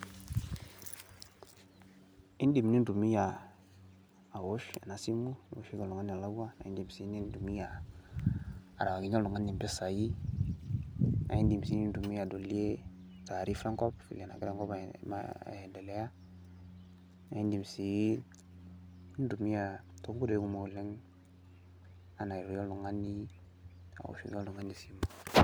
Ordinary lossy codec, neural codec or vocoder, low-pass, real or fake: none; none; none; real